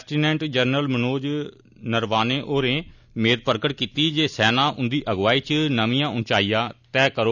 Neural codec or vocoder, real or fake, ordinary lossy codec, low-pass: none; real; none; 7.2 kHz